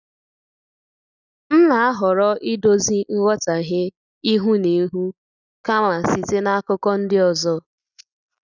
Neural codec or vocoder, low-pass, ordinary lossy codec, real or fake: none; 7.2 kHz; none; real